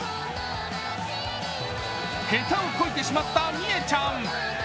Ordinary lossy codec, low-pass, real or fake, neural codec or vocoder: none; none; real; none